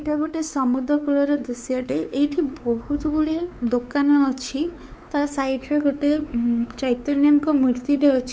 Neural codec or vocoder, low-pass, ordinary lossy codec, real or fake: codec, 16 kHz, 4 kbps, X-Codec, WavLM features, trained on Multilingual LibriSpeech; none; none; fake